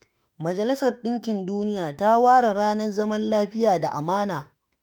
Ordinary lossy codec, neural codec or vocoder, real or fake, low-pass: none; autoencoder, 48 kHz, 32 numbers a frame, DAC-VAE, trained on Japanese speech; fake; none